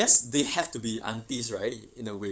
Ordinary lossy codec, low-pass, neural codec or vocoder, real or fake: none; none; codec, 16 kHz, 8 kbps, FunCodec, trained on LibriTTS, 25 frames a second; fake